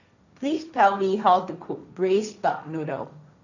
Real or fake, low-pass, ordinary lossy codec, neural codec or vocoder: fake; 7.2 kHz; none; codec, 16 kHz, 1.1 kbps, Voila-Tokenizer